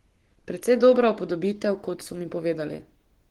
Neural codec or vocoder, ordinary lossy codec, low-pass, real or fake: codec, 44.1 kHz, 7.8 kbps, Pupu-Codec; Opus, 16 kbps; 19.8 kHz; fake